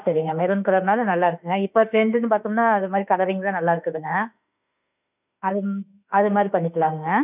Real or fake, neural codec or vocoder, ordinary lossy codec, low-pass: fake; autoencoder, 48 kHz, 32 numbers a frame, DAC-VAE, trained on Japanese speech; none; 3.6 kHz